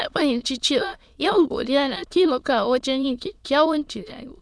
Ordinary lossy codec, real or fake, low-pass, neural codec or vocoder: none; fake; none; autoencoder, 22.05 kHz, a latent of 192 numbers a frame, VITS, trained on many speakers